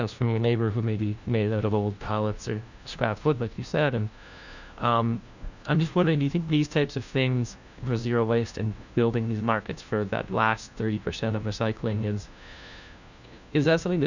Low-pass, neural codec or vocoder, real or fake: 7.2 kHz; codec, 16 kHz, 1 kbps, FunCodec, trained on LibriTTS, 50 frames a second; fake